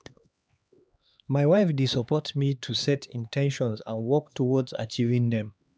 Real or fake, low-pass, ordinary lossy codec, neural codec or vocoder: fake; none; none; codec, 16 kHz, 2 kbps, X-Codec, HuBERT features, trained on LibriSpeech